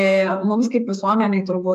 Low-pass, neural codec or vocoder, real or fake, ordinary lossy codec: 14.4 kHz; codec, 32 kHz, 1.9 kbps, SNAC; fake; AAC, 96 kbps